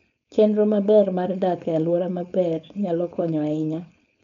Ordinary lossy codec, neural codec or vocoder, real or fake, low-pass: none; codec, 16 kHz, 4.8 kbps, FACodec; fake; 7.2 kHz